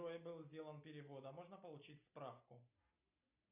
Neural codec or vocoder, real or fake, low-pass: none; real; 3.6 kHz